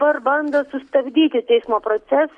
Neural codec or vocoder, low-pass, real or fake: none; 10.8 kHz; real